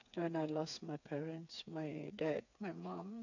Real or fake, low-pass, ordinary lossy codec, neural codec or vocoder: fake; 7.2 kHz; none; codec, 16 kHz, 4 kbps, FreqCodec, smaller model